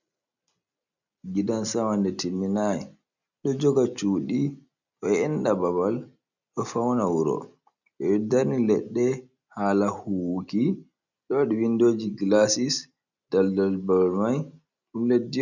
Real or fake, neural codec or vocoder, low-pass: real; none; 7.2 kHz